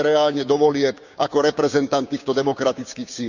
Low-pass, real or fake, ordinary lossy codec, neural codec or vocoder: 7.2 kHz; fake; none; codec, 16 kHz, 6 kbps, DAC